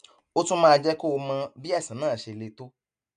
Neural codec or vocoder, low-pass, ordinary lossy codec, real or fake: none; 9.9 kHz; none; real